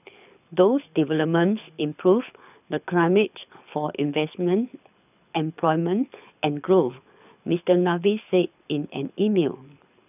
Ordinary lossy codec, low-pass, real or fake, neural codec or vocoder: none; 3.6 kHz; fake; codec, 24 kHz, 6 kbps, HILCodec